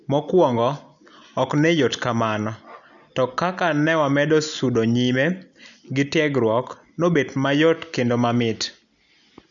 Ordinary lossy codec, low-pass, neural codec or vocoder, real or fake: none; 7.2 kHz; none; real